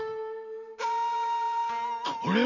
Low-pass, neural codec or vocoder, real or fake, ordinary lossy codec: 7.2 kHz; none; real; none